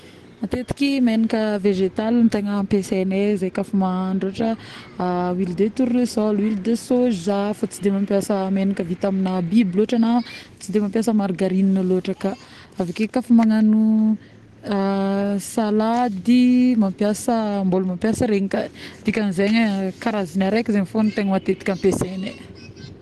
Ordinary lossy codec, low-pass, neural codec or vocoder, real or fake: Opus, 16 kbps; 10.8 kHz; none; real